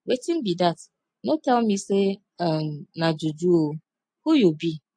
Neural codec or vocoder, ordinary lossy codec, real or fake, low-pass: none; MP3, 48 kbps; real; 9.9 kHz